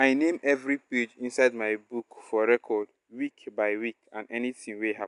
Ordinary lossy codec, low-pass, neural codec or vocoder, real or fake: none; 10.8 kHz; none; real